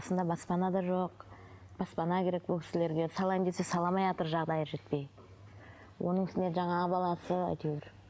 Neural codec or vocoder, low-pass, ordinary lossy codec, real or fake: none; none; none; real